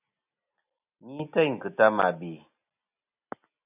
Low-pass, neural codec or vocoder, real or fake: 3.6 kHz; none; real